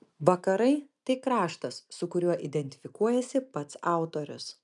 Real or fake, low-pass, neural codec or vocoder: real; 10.8 kHz; none